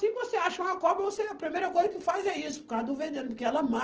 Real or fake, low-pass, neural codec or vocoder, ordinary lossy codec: real; 7.2 kHz; none; Opus, 16 kbps